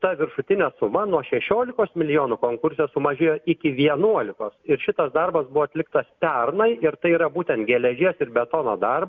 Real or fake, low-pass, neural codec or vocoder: real; 7.2 kHz; none